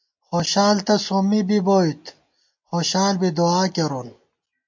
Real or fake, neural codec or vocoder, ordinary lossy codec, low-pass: real; none; MP3, 48 kbps; 7.2 kHz